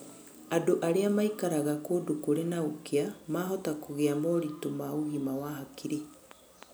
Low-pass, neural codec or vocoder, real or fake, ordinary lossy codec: none; none; real; none